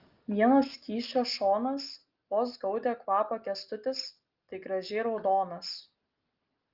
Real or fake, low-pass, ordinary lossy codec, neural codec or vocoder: real; 5.4 kHz; Opus, 32 kbps; none